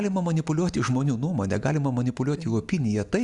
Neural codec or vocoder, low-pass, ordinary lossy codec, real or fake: none; 10.8 kHz; Opus, 64 kbps; real